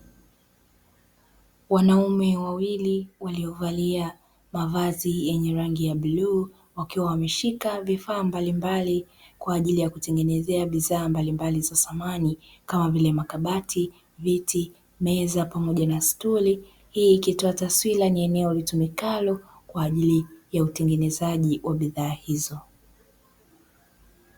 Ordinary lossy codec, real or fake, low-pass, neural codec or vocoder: Opus, 64 kbps; real; 19.8 kHz; none